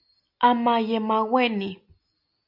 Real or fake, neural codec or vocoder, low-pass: real; none; 5.4 kHz